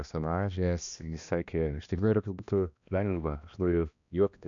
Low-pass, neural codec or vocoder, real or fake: 7.2 kHz; codec, 16 kHz, 1 kbps, X-Codec, HuBERT features, trained on balanced general audio; fake